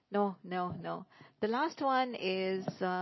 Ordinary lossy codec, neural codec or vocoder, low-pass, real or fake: MP3, 24 kbps; none; 7.2 kHz; real